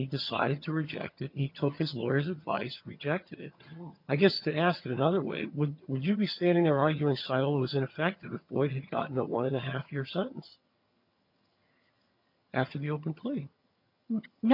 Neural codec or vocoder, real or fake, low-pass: vocoder, 22.05 kHz, 80 mel bands, HiFi-GAN; fake; 5.4 kHz